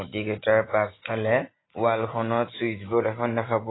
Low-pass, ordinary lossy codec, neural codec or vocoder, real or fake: 7.2 kHz; AAC, 16 kbps; none; real